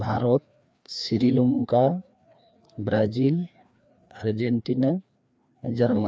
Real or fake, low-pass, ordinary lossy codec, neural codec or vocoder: fake; none; none; codec, 16 kHz, 2 kbps, FreqCodec, larger model